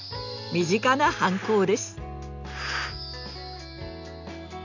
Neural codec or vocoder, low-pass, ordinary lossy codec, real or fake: none; 7.2 kHz; none; real